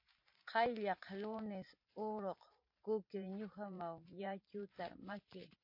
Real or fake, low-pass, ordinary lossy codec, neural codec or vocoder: fake; 5.4 kHz; MP3, 32 kbps; vocoder, 44.1 kHz, 128 mel bands every 512 samples, BigVGAN v2